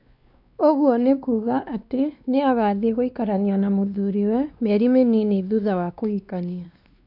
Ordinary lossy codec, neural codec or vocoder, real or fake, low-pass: none; codec, 16 kHz, 2 kbps, X-Codec, WavLM features, trained on Multilingual LibriSpeech; fake; 5.4 kHz